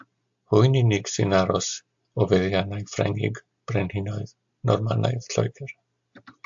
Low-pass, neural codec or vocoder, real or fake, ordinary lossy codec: 7.2 kHz; none; real; AAC, 64 kbps